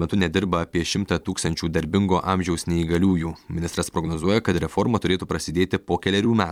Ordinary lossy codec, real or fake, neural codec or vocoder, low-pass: MP3, 96 kbps; fake; vocoder, 44.1 kHz, 128 mel bands every 256 samples, BigVGAN v2; 19.8 kHz